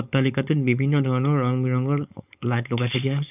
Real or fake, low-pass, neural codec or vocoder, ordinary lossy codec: fake; 3.6 kHz; codec, 16 kHz, 4.8 kbps, FACodec; none